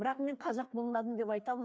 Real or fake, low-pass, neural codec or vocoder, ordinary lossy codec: fake; none; codec, 16 kHz, 2 kbps, FreqCodec, larger model; none